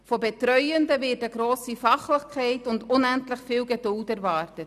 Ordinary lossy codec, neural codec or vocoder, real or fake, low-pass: none; none; real; 14.4 kHz